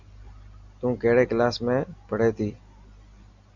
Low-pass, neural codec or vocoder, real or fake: 7.2 kHz; none; real